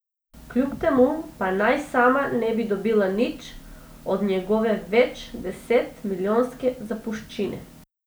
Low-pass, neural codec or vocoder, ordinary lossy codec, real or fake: none; none; none; real